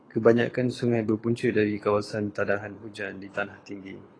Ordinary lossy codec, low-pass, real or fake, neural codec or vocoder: AAC, 32 kbps; 9.9 kHz; fake; codec, 24 kHz, 6 kbps, HILCodec